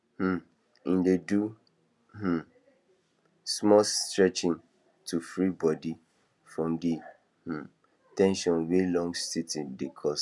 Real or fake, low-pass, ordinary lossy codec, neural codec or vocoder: real; none; none; none